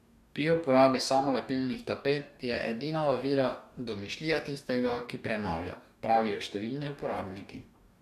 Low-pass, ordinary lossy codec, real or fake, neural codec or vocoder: 14.4 kHz; none; fake; codec, 44.1 kHz, 2.6 kbps, DAC